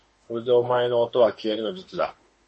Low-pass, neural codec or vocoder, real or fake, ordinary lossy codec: 10.8 kHz; autoencoder, 48 kHz, 32 numbers a frame, DAC-VAE, trained on Japanese speech; fake; MP3, 32 kbps